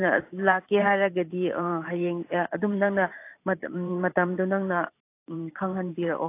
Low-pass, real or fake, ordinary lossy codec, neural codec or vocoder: 3.6 kHz; fake; AAC, 24 kbps; vocoder, 44.1 kHz, 128 mel bands every 512 samples, BigVGAN v2